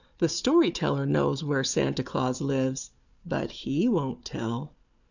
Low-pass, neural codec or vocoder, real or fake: 7.2 kHz; codec, 16 kHz, 4 kbps, FunCodec, trained on Chinese and English, 50 frames a second; fake